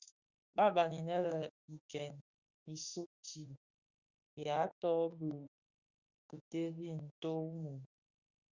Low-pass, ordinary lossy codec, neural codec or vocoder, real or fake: 7.2 kHz; Opus, 64 kbps; autoencoder, 48 kHz, 32 numbers a frame, DAC-VAE, trained on Japanese speech; fake